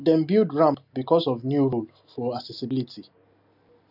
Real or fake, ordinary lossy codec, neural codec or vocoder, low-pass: real; none; none; 5.4 kHz